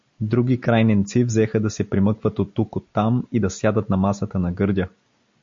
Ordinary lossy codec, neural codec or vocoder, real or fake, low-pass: MP3, 48 kbps; none; real; 7.2 kHz